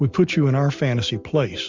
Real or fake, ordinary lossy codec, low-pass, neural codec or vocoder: real; MP3, 64 kbps; 7.2 kHz; none